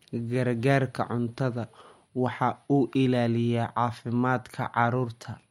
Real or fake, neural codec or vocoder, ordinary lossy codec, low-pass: real; none; MP3, 64 kbps; 19.8 kHz